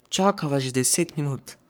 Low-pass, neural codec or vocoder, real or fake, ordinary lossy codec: none; codec, 44.1 kHz, 3.4 kbps, Pupu-Codec; fake; none